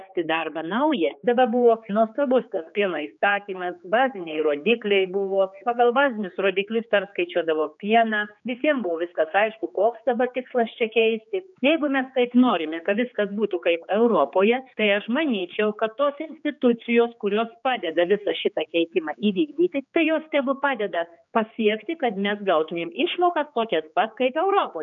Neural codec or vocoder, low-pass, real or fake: codec, 16 kHz, 4 kbps, X-Codec, HuBERT features, trained on general audio; 7.2 kHz; fake